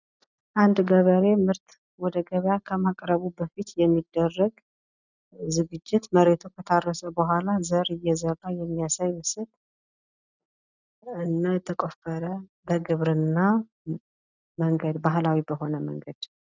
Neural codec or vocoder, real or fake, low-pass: none; real; 7.2 kHz